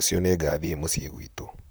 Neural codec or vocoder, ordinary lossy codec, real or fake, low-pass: vocoder, 44.1 kHz, 128 mel bands, Pupu-Vocoder; none; fake; none